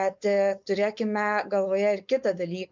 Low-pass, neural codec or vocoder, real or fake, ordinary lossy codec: 7.2 kHz; codec, 16 kHz, 4.8 kbps, FACodec; fake; MP3, 64 kbps